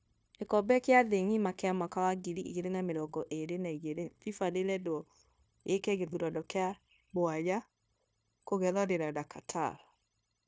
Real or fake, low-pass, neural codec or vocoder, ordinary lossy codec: fake; none; codec, 16 kHz, 0.9 kbps, LongCat-Audio-Codec; none